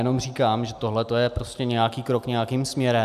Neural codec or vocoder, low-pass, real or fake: none; 14.4 kHz; real